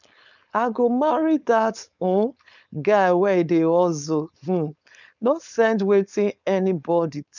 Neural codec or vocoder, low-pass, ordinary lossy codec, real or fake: codec, 16 kHz, 4.8 kbps, FACodec; 7.2 kHz; none; fake